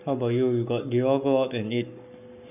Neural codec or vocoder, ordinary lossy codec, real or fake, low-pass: codec, 44.1 kHz, 7.8 kbps, Pupu-Codec; none; fake; 3.6 kHz